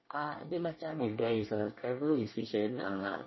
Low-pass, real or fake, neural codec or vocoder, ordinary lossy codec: 7.2 kHz; fake; codec, 24 kHz, 1 kbps, SNAC; MP3, 24 kbps